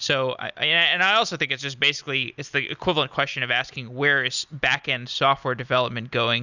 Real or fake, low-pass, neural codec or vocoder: real; 7.2 kHz; none